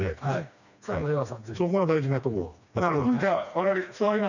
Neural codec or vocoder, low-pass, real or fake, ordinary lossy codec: codec, 16 kHz, 2 kbps, FreqCodec, smaller model; 7.2 kHz; fake; none